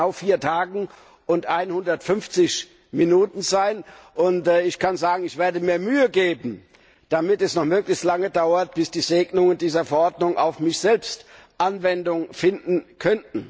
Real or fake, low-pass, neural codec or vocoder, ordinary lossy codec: real; none; none; none